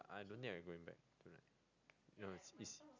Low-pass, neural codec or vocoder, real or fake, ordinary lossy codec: 7.2 kHz; none; real; none